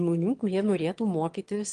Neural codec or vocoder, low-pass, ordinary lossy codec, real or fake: autoencoder, 22.05 kHz, a latent of 192 numbers a frame, VITS, trained on one speaker; 9.9 kHz; Opus, 24 kbps; fake